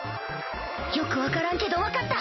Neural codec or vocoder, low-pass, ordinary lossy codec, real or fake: none; 7.2 kHz; MP3, 24 kbps; real